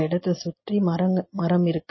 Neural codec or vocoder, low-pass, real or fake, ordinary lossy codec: none; 7.2 kHz; real; MP3, 24 kbps